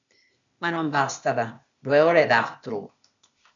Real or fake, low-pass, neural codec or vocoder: fake; 7.2 kHz; codec, 16 kHz, 0.8 kbps, ZipCodec